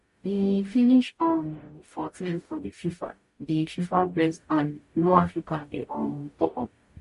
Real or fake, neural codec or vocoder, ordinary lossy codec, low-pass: fake; codec, 44.1 kHz, 0.9 kbps, DAC; MP3, 48 kbps; 14.4 kHz